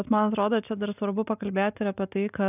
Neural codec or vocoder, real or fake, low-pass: none; real; 3.6 kHz